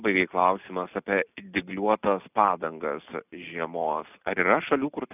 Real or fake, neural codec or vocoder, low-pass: real; none; 3.6 kHz